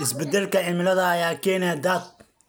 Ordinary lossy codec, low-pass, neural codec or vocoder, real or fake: none; none; none; real